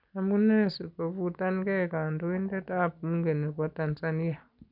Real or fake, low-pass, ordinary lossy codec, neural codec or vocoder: real; 5.4 kHz; none; none